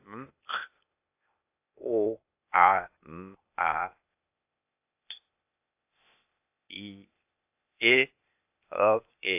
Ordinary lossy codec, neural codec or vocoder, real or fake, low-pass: AAC, 32 kbps; codec, 16 kHz, 0.8 kbps, ZipCodec; fake; 3.6 kHz